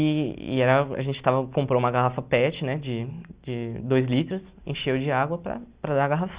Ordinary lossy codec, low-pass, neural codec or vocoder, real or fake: Opus, 64 kbps; 3.6 kHz; none; real